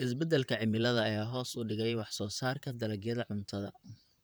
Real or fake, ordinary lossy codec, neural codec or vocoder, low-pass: fake; none; vocoder, 44.1 kHz, 128 mel bands, Pupu-Vocoder; none